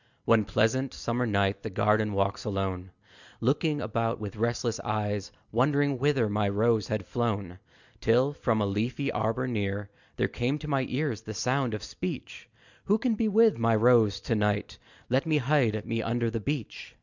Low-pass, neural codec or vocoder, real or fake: 7.2 kHz; none; real